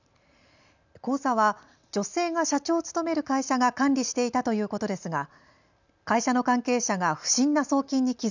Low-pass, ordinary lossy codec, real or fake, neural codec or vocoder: 7.2 kHz; none; real; none